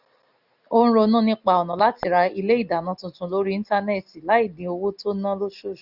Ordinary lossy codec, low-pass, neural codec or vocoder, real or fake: none; 5.4 kHz; none; real